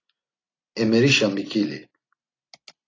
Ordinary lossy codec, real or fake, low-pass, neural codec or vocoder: AAC, 32 kbps; real; 7.2 kHz; none